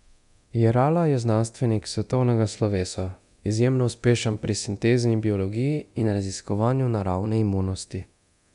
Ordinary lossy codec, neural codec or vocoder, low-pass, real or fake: none; codec, 24 kHz, 0.9 kbps, DualCodec; 10.8 kHz; fake